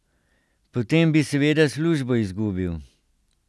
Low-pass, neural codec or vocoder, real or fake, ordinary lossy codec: none; none; real; none